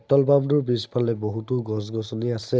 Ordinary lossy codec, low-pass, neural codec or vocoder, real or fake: none; none; none; real